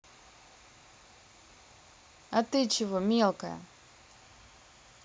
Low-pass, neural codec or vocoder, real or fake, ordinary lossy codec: none; none; real; none